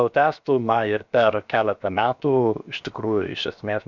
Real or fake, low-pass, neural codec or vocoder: fake; 7.2 kHz; codec, 16 kHz, 0.7 kbps, FocalCodec